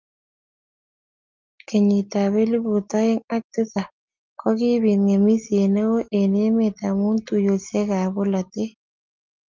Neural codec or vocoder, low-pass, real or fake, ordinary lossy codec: none; 7.2 kHz; real; Opus, 24 kbps